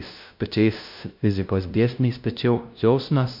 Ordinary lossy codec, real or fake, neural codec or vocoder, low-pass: MP3, 48 kbps; fake; codec, 16 kHz, 0.5 kbps, FunCodec, trained on LibriTTS, 25 frames a second; 5.4 kHz